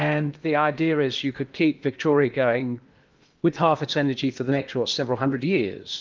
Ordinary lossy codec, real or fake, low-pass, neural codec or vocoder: Opus, 24 kbps; fake; 7.2 kHz; codec, 16 kHz in and 24 kHz out, 0.8 kbps, FocalCodec, streaming, 65536 codes